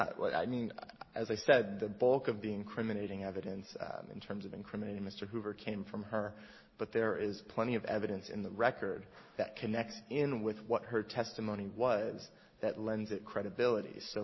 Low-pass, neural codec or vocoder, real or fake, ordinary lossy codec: 7.2 kHz; none; real; MP3, 24 kbps